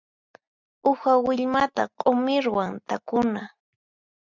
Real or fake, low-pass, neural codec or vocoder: real; 7.2 kHz; none